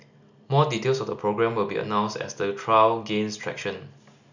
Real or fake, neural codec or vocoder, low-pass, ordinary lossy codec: real; none; 7.2 kHz; none